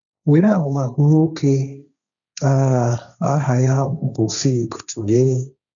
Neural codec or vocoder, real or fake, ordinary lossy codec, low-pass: codec, 16 kHz, 1.1 kbps, Voila-Tokenizer; fake; none; 7.2 kHz